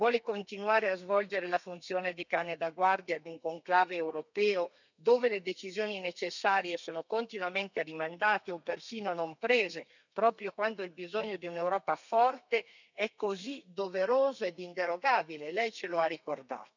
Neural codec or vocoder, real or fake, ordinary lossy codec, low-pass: codec, 32 kHz, 1.9 kbps, SNAC; fake; none; 7.2 kHz